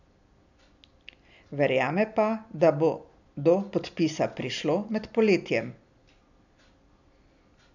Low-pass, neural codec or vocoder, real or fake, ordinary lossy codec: 7.2 kHz; none; real; none